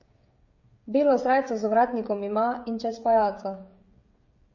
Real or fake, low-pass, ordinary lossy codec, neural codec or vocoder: fake; 7.2 kHz; MP3, 32 kbps; codec, 16 kHz, 16 kbps, FreqCodec, smaller model